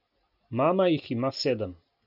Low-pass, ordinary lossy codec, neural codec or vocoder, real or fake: 5.4 kHz; none; none; real